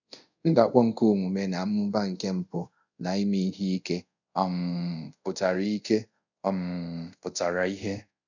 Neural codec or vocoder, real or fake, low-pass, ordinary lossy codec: codec, 24 kHz, 0.5 kbps, DualCodec; fake; 7.2 kHz; none